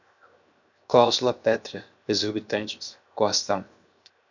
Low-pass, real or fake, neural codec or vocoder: 7.2 kHz; fake; codec, 16 kHz, 0.7 kbps, FocalCodec